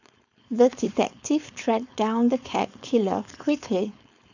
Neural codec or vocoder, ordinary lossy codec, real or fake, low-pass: codec, 16 kHz, 4.8 kbps, FACodec; none; fake; 7.2 kHz